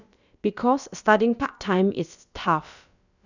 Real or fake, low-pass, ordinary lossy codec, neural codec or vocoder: fake; 7.2 kHz; none; codec, 16 kHz, about 1 kbps, DyCAST, with the encoder's durations